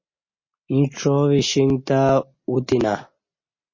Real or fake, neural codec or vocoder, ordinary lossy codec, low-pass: real; none; MP3, 32 kbps; 7.2 kHz